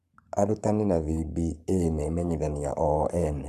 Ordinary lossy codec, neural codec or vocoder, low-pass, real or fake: none; codec, 44.1 kHz, 7.8 kbps, Pupu-Codec; 14.4 kHz; fake